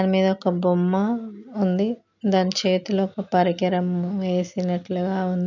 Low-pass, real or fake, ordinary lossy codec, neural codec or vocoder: 7.2 kHz; real; MP3, 64 kbps; none